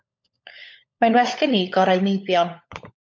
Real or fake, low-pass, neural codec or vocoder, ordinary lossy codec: fake; 7.2 kHz; codec, 16 kHz, 16 kbps, FunCodec, trained on LibriTTS, 50 frames a second; MP3, 48 kbps